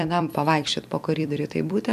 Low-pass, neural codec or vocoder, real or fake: 14.4 kHz; vocoder, 44.1 kHz, 128 mel bands every 256 samples, BigVGAN v2; fake